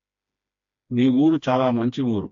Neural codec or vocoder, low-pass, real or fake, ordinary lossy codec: codec, 16 kHz, 2 kbps, FreqCodec, smaller model; 7.2 kHz; fake; AAC, 64 kbps